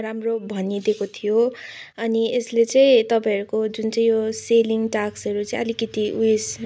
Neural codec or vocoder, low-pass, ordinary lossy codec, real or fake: none; none; none; real